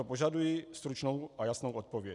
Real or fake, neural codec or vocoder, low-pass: real; none; 10.8 kHz